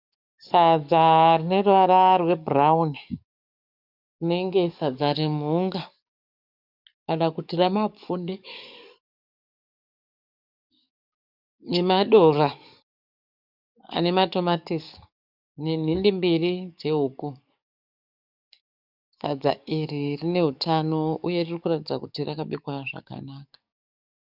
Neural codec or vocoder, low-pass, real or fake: codec, 44.1 kHz, 7.8 kbps, DAC; 5.4 kHz; fake